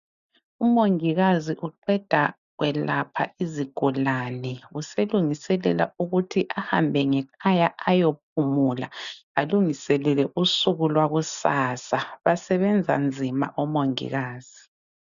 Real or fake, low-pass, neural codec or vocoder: real; 7.2 kHz; none